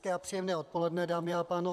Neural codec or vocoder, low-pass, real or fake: vocoder, 44.1 kHz, 128 mel bands, Pupu-Vocoder; 14.4 kHz; fake